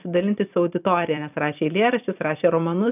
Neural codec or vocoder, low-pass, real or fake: vocoder, 44.1 kHz, 128 mel bands every 256 samples, BigVGAN v2; 3.6 kHz; fake